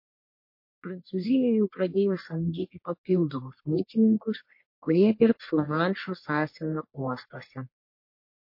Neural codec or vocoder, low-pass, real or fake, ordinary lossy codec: codec, 44.1 kHz, 1.7 kbps, Pupu-Codec; 5.4 kHz; fake; MP3, 32 kbps